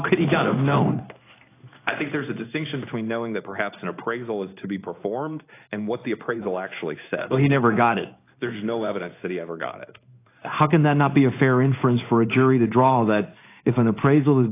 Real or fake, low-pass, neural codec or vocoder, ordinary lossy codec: fake; 3.6 kHz; codec, 16 kHz in and 24 kHz out, 1 kbps, XY-Tokenizer; AAC, 24 kbps